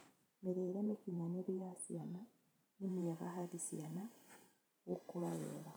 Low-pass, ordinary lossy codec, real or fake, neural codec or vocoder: none; none; fake; vocoder, 44.1 kHz, 128 mel bands every 512 samples, BigVGAN v2